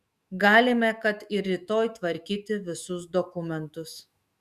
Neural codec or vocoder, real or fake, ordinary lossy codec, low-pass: autoencoder, 48 kHz, 128 numbers a frame, DAC-VAE, trained on Japanese speech; fake; Opus, 64 kbps; 14.4 kHz